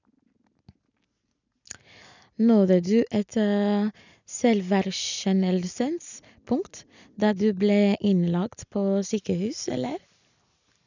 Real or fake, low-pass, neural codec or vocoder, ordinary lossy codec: real; 7.2 kHz; none; none